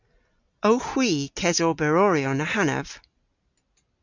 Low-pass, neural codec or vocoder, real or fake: 7.2 kHz; none; real